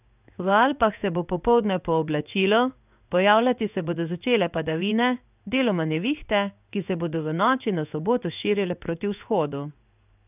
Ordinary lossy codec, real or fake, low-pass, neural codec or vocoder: none; fake; 3.6 kHz; codec, 16 kHz in and 24 kHz out, 1 kbps, XY-Tokenizer